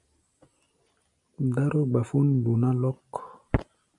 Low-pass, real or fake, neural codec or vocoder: 10.8 kHz; real; none